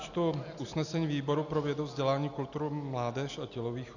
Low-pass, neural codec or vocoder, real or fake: 7.2 kHz; none; real